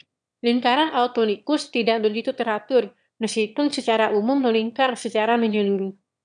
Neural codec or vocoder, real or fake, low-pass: autoencoder, 22.05 kHz, a latent of 192 numbers a frame, VITS, trained on one speaker; fake; 9.9 kHz